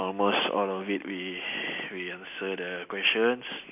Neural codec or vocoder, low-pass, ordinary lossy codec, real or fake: none; 3.6 kHz; none; real